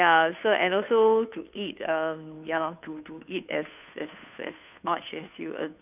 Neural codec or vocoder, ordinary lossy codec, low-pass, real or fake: codec, 16 kHz, 2 kbps, FunCodec, trained on Chinese and English, 25 frames a second; none; 3.6 kHz; fake